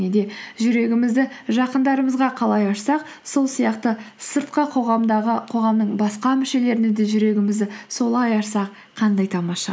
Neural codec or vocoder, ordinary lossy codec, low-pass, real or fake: none; none; none; real